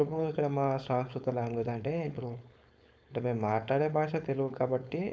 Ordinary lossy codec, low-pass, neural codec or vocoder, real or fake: none; none; codec, 16 kHz, 4.8 kbps, FACodec; fake